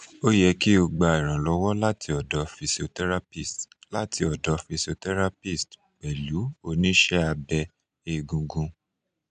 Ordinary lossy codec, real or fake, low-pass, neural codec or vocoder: MP3, 96 kbps; real; 9.9 kHz; none